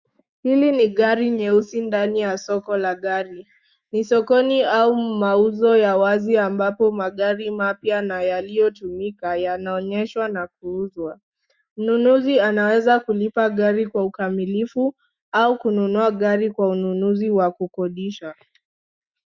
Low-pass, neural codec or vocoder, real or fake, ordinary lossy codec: 7.2 kHz; autoencoder, 48 kHz, 128 numbers a frame, DAC-VAE, trained on Japanese speech; fake; Opus, 64 kbps